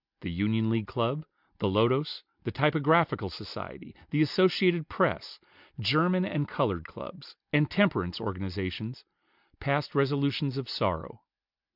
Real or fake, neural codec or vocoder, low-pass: real; none; 5.4 kHz